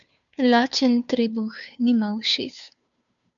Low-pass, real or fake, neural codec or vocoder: 7.2 kHz; fake; codec, 16 kHz, 2 kbps, FunCodec, trained on Chinese and English, 25 frames a second